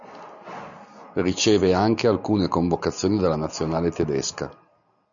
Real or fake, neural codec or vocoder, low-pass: real; none; 7.2 kHz